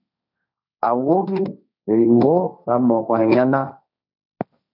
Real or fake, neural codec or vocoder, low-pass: fake; codec, 16 kHz, 1.1 kbps, Voila-Tokenizer; 5.4 kHz